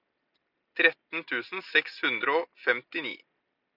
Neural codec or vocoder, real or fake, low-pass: none; real; 5.4 kHz